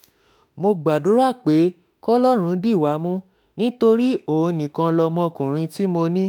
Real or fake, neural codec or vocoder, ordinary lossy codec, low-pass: fake; autoencoder, 48 kHz, 32 numbers a frame, DAC-VAE, trained on Japanese speech; none; none